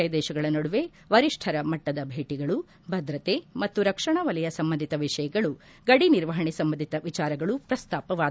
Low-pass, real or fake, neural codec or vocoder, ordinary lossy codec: none; real; none; none